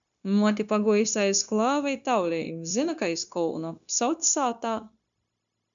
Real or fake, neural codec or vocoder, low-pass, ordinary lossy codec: fake; codec, 16 kHz, 0.9 kbps, LongCat-Audio-Codec; 7.2 kHz; MP3, 64 kbps